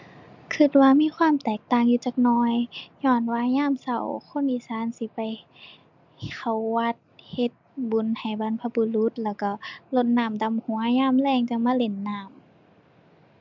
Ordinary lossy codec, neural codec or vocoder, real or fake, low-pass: MP3, 64 kbps; none; real; 7.2 kHz